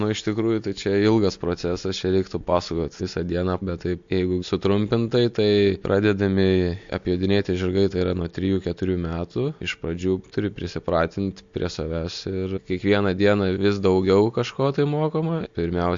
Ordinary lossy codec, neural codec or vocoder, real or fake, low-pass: MP3, 48 kbps; none; real; 7.2 kHz